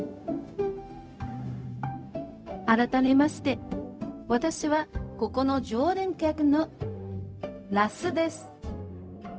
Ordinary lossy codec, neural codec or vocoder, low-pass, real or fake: none; codec, 16 kHz, 0.4 kbps, LongCat-Audio-Codec; none; fake